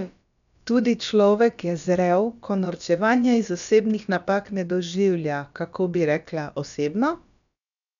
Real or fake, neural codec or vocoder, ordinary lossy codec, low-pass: fake; codec, 16 kHz, about 1 kbps, DyCAST, with the encoder's durations; none; 7.2 kHz